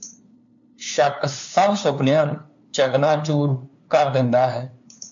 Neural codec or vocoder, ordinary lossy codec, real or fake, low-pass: codec, 16 kHz, 2 kbps, FunCodec, trained on LibriTTS, 25 frames a second; MP3, 64 kbps; fake; 7.2 kHz